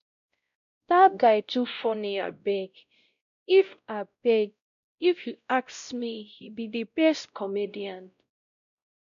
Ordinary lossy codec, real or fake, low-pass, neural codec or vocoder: none; fake; 7.2 kHz; codec, 16 kHz, 0.5 kbps, X-Codec, WavLM features, trained on Multilingual LibriSpeech